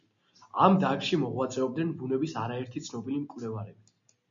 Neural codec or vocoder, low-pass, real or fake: none; 7.2 kHz; real